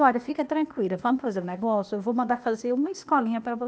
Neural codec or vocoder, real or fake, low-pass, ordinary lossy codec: codec, 16 kHz, 0.8 kbps, ZipCodec; fake; none; none